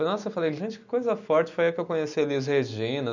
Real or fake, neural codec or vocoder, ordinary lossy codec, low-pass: real; none; none; 7.2 kHz